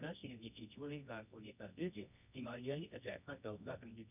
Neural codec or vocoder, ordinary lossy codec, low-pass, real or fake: codec, 24 kHz, 0.9 kbps, WavTokenizer, medium music audio release; none; 3.6 kHz; fake